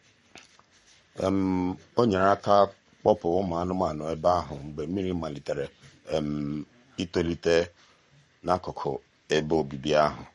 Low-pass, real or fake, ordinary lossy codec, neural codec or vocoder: 19.8 kHz; fake; MP3, 48 kbps; codec, 44.1 kHz, 7.8 kbps, Pupu-Codec